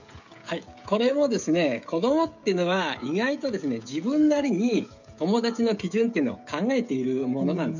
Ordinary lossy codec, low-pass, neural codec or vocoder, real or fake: none; 7.2 kHz; codec, 16 kHz, 16 kbps, FreqCodec, smaller model; fake